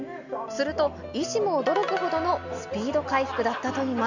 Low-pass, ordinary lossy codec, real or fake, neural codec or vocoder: 7.2 kHz; none; real; none